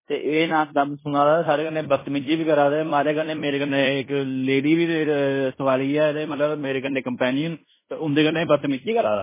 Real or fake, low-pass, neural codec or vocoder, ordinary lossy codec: fake; 3.6 kHz; codec, 16 kHz in and 24 kHz out, 0.9 kbps, LongCat-Audio-Codec, fine tuned four codebook decoder; MP3, 16 kbps